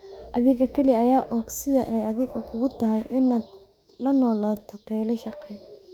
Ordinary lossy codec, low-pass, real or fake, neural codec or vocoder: none; 19.8 kHz; fake; autoencoder, 48 kHz, 32 numbers a frame, DAC-VAE, trained on Japanese speech